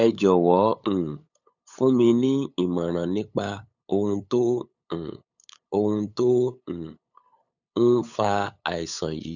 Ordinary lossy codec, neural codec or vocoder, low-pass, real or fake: none; codec, 16 kHz, 8 kbps, FunCodec, trained on LibriTTS, 25 frames a second; 7.2 kHz; fake